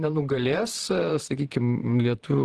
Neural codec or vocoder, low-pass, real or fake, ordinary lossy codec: vocoder, 44.1 kHz, 128 mel bands, Pupu-Vocoder; 10.8 kHz; fake; Opus, 16 kbps